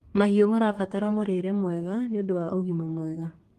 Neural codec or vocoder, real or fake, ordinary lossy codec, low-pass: codec, 32 kHz, 1.9 kbps, SNAC; fake; Opus, 24 kbps; 14.4 kHz